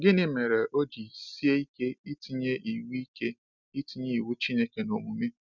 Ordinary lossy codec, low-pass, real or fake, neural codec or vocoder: none; 7.2 kHz; real; none